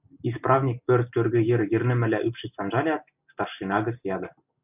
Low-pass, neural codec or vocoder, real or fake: 3.6 kHz; none; real